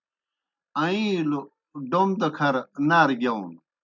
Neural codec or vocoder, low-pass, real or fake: none; 7.2 kHz; real